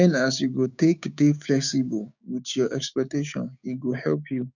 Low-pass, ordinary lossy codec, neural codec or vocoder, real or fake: 7.2 kHz; none; codec, 16 kHz, 6 kbps, DAC; fake